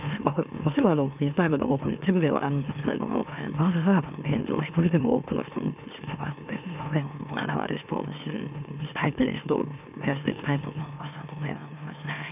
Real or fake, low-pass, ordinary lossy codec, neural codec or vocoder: fake; 3.6 kHz; none; autoencoder, 44.1 kHz, a latent of 192 numbers a frame, MeloTTS